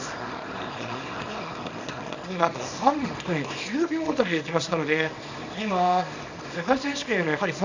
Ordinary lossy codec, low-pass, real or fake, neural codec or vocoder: AAC, 48 kbps; 7.2 kHz; fake; codec, 24 kHz, 0.9 kbps, WavTokenizer, small release